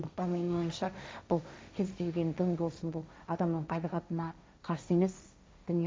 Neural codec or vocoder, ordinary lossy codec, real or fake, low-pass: codec, 16 kHz, 1.1 kbps, Voila-Tokenizer; none; fake; none